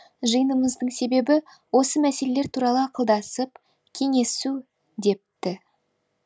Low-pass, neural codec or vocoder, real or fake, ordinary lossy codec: none; none; real; none